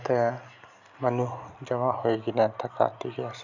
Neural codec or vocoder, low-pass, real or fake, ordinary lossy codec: none; 7.2 kHz; real; none